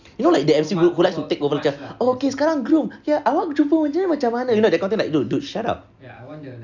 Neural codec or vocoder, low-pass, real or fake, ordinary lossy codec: none; 7.2 kHz; real; Opus, 64 kbps